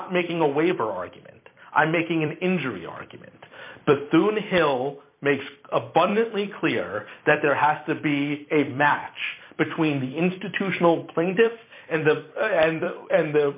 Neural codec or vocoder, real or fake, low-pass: vocoder, 44.1 kHz, 128 mel bands every 256 samples, BigVGAN v2; fake; 3.6 kHz